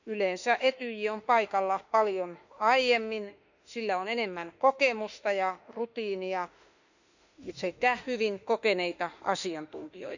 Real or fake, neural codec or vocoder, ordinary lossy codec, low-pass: fake; autoencoder, 48 kHz, 32 numbers a frame, DAC-VAE, trained on Japanese speech; none; 7.2 kHz